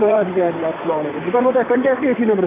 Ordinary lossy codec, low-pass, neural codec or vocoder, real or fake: AAC, 16 kbps; 3.6 kHz; vocoder, 44.1 kHz, 128 mel bands, Pupu-Vocoder; fake